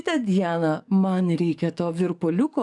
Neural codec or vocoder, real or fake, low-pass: codec, 44.1 kHz, 7.8 kbps, DAC; fake; 10.8 kHz